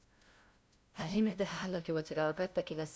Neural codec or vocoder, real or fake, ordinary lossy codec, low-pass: codec, 16 kHz, 0.5 kbps, FunCodec, trained on LibriTTS, 25 frames a second; fake; none; none